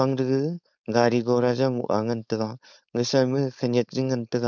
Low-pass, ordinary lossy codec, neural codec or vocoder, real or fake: 7.2 kHz; none; codec, 16 kHz, 4.8 kbps, FACodec; fake